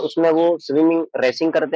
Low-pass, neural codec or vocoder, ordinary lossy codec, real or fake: none; none; none; real